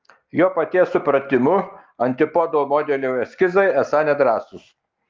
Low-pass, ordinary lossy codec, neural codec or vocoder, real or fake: 7.2 kHz; Opus, 32 kbps; none; real